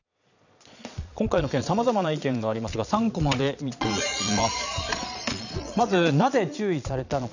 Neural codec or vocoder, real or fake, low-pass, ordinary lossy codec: vocoder, 22.05 kHz, 80 mel bands, Vocos; fake; 7.2 kHz; none